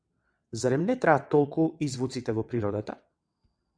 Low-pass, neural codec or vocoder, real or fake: 9.9 kHz; vocoder, 22.05 kHz, 80 mel bands, WaveNeXt; fake